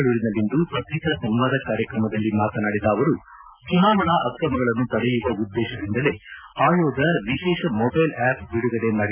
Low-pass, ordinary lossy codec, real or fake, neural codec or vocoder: 3.6 kHz; none; real; none